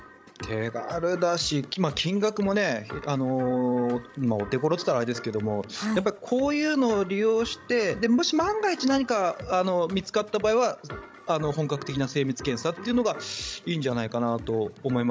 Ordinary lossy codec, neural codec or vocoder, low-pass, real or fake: none; codec, 16 kHz, 16 kbps, FreqCodec, larger model; none; fake